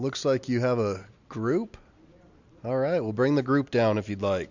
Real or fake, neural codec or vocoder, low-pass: real; none; 7.2 kHz